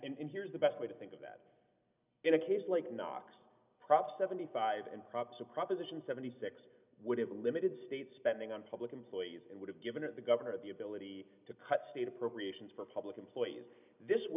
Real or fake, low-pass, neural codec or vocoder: real; 3.6 kHz; none